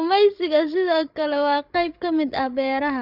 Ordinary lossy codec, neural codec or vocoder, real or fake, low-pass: none; codec, 16 kHz, 16 kbps, FreqCodec, larger model; fake; 5.4 kHz